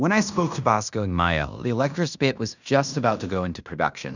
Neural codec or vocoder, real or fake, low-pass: codec, 16 kHz in and 24 kHz out, 0.9 kbps, LongCat-Audio-Codec, fine tuned four codebook decoder; fake; 7.2 kHz